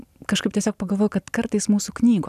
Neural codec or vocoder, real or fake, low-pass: none; real; 14.4 kHz